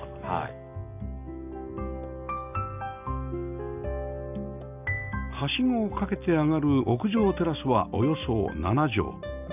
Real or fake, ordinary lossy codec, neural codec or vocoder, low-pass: real; none; none; 3.6 kHz